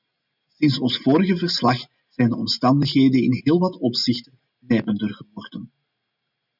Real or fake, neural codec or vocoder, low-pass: real; none; 5.4 kHz